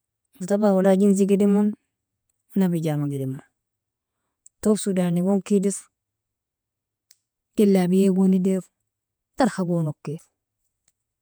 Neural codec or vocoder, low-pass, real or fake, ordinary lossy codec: vocoder, 48 kHz, 128 mel bands, Vocos; none; fake; none